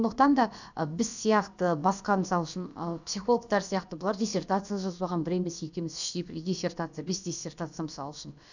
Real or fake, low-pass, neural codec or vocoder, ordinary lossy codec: fake; 7.2 kHz; codec, 16 kHz, about 1 kbps, DyCAST, with the encoder's durations; none